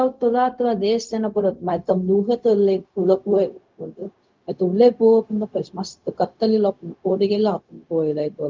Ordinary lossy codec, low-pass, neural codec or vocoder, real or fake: Opus, 32 kbps; 7.2 kHz; codec, 16 kHz, 0.4 kbps, LongCat-Audio-Codec; fake